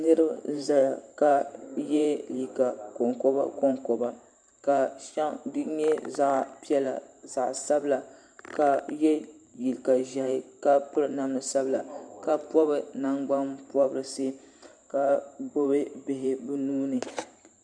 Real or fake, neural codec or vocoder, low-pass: fake; vocoder, 44.1 kHz, 128 mel bands every 512 samples, BigVGAN v2; 9.9 kHz